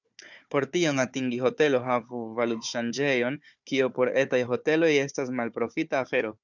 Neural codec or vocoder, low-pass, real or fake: codec, 16 kHz, 4 kbps, FunCodec, trained on Chinese and English, 50 frames a second; 7.2 kHz; fake